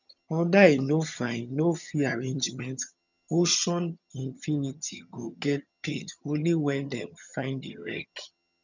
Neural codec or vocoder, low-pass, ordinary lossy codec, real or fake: vocoder, 22.05 kHz, 80 mel bands, HiFi-GAN; 7.2 kHz; none; fake